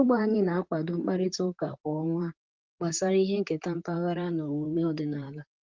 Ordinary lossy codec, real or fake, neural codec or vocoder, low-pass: Opus, 16 kbps; fake; vocoder, 44.1 kHz, 128 mel bands, Pupu-Vocoder; 7.2 kHz